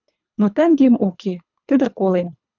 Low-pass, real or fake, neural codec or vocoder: 7.2 kHz; fake; codec, 24 kHz, 3 kbps, HILCodec